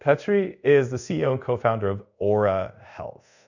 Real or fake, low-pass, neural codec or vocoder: fake; 7.2 kHz; codec, 24 kHz, 0.5 kbps, DualCodec